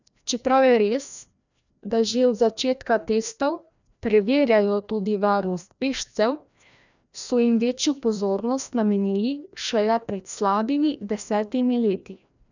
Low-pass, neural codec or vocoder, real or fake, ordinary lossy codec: 7.2 kHz; codec, 16 kHz, 1 kbps, FreqCodec, larger model; fake; none